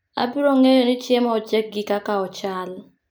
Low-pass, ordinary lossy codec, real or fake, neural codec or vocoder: none; none; real; none